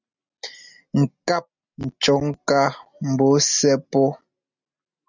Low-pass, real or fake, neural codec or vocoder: 7.2 kHz; real; none